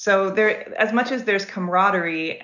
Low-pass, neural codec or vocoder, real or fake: 7.2 kHz; none; real